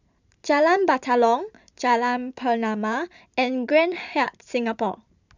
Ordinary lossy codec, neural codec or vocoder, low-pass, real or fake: none; vocoder, 44.1 kHz, 128 mel bands every 512 samples, BigVGAN v2; 7.2 kHz; fake